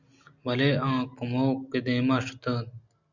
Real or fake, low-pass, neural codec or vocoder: real; 7.2 kHz; none